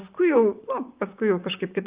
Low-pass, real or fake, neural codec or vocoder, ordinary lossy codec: 3.6 kHz; fake; vocoder, 44.1 kHz, 128 mel bands, Pupu-Vocoder; Opus, 24 kbps